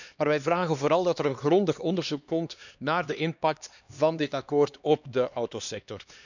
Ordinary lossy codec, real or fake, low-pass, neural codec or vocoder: none; fake; 7.2 kHz; codec, 16 kHz, 2 kbps, X-Codec, HuBERT features, trained on LibriSpeech